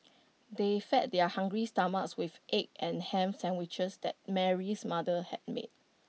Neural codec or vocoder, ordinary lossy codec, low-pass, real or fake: none; none; none; real